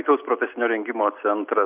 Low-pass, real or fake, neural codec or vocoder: 3.6 kHz; real; none